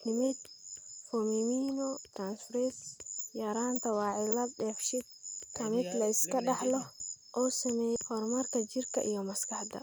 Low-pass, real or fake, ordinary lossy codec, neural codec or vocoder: none; real; none; none